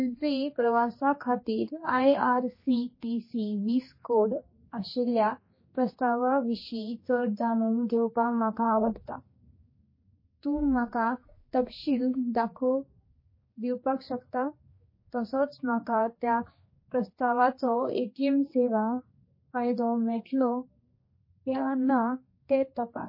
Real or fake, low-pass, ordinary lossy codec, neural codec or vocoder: fake; 5.4 kHz; MP3, 24 kbps; codec, 16 kHz, 2 kbps, X-Codec, HuBERT features, trained on general audio